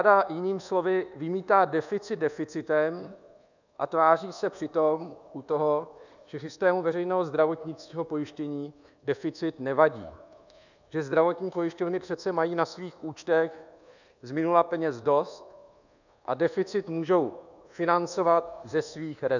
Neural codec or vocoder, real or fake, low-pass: codec, 24 kHz, 1.2 kbps, DualCodec; fake; 7.2 kHz